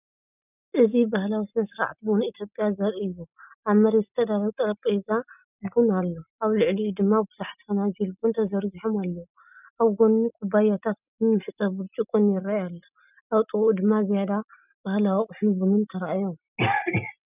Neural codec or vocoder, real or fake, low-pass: none; real; 3.6 kHz